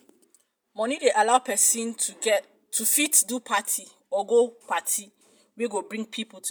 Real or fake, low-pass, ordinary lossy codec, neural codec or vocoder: real; none; none; none